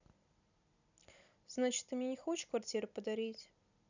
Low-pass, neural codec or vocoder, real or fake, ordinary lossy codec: 7.2 kHz; vocoder, 44.1 kHz, 128 mel bands every 256 samples, BigVGAN v2; fake; none